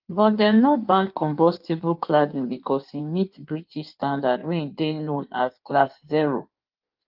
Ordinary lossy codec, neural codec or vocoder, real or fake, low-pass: Opus, 32 kbps; codec, 16 kHz in and 24 kHz out, 1.1 kbps, FireRedTTS-2 codec; fake; 5.4 kHz